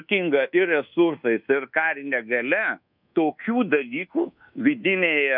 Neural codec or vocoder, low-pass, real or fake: codec, 24 kHz, 1.2 kbps, DualCodec; 5.4 kHz; fake